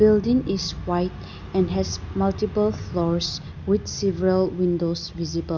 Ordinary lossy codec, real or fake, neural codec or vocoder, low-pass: none; real; none; 7.2 kHz